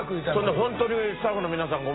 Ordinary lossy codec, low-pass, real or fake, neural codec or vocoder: AAC, 16 kbps; 7.2 kHz; real; none